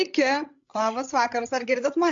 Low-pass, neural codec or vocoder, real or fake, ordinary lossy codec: 7.2 kHz; codec, 16 kHz, 16 kbps, FreqCodec, larger model; fake; AAC, 64 kbps